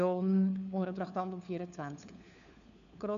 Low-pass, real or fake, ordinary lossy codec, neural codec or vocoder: 7.2 kHz; fake; none; codec, 16 kHz, 4 kbps, FunCodec, trained on LibriTTS, 50 frames a second